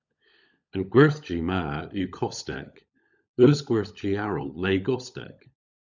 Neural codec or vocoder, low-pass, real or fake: codec, 16 kHz, 16 kbps, FunCodec, trained on LibriTTS, 50 frames a second; 7.2 kHz; fake